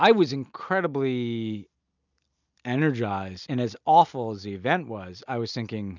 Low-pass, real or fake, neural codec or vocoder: 7.2 kHz; real; none